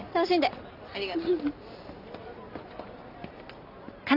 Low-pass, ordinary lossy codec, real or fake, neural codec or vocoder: 5.4 kHz; none; real; none